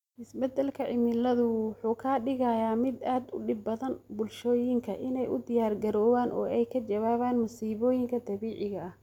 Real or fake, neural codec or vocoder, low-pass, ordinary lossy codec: real; none; 19.8 kHz; none